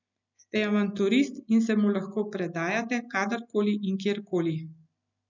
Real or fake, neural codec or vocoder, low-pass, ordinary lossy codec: real; none; 7.2 kHz; none